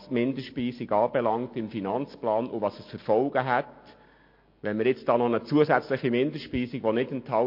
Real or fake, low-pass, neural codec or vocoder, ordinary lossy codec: real; 5.4 kHz; none; MP3, 32 kbps